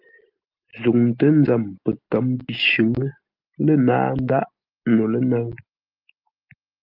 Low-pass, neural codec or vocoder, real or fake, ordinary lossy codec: 5.4 kHz; none; real; Opus, 24 kbps